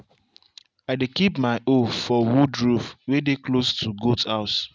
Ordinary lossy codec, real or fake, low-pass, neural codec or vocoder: none; real; none; none